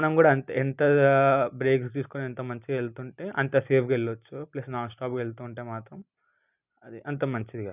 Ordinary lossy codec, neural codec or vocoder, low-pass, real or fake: none; none; 3.6 kHz; real